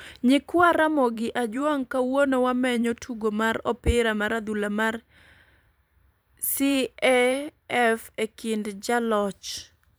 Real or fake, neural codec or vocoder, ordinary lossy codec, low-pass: fake; vocoder, 44.1 kHz, 128 mel bands every 512 samples, BigVGAN v2; none; none